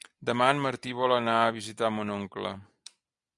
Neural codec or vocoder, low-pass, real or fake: none; 10.8 kHz; real